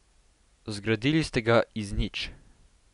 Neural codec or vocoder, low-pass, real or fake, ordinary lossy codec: none; 10.8 kHz; real; none